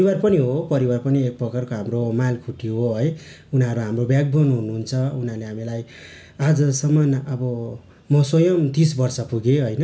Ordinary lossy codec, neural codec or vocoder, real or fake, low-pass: none; none; real; none